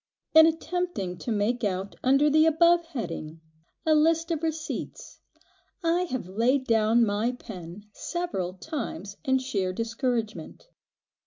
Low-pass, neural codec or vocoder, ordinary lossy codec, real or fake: 7.2 kHz; none; MP3, 48 kbps; real